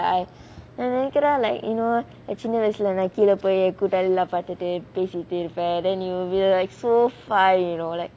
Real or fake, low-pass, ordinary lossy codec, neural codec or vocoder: real; none; none; none